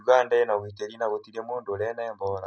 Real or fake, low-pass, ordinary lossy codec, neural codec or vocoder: real; none; none; none